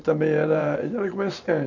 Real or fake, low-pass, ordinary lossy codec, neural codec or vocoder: fake; 7.2 kHz; none; vocoder, 44.1 kHz, 128 mel bands every 512 samples, BigVGAN v2